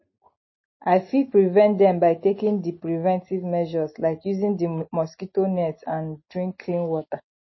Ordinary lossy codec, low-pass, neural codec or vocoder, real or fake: MP3, 24 kbps; 7.2 kHz; none; real